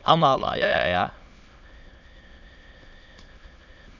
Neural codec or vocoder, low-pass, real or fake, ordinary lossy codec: autoencoder, 22.05 kHz, a latent of 192 numbers a frame, VITS, trained on many speakers; 7.2 kHz; fake; none